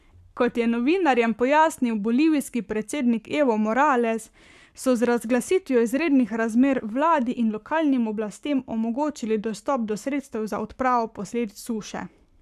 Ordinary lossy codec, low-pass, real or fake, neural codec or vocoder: none; 14.4 kHz; fake; codec, 44.1 kHz, 7.8 kbps, Pupu-Codec